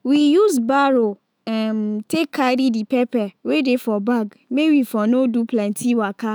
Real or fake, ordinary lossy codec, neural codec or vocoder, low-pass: fake; none; autoencoder, 48 kHz, 128 numbers a frame, DAC-VAE, trained on Japanese speech; none